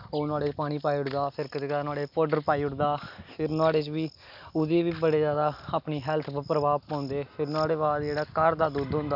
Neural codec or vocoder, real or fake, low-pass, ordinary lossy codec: none; real; 5.4 kHz; none